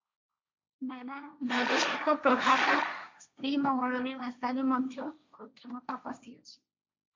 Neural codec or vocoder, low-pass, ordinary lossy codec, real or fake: codec, 16 kHz, 1.1 kbps, Voila-Tokenizer; 7.2 kHz; MP3, 64 kbps; fake